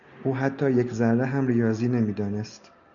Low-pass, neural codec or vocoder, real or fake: 7.2 kHz; none; real